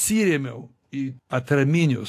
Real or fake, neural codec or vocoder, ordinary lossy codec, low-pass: real; none; AAC, 64 kbps; 14.4 kHz